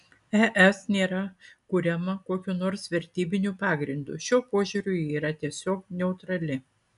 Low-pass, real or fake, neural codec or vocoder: 10.8 kHz; real; none